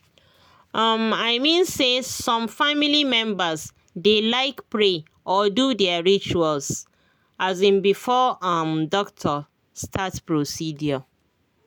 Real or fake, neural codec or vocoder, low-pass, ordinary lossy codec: real; none; none; none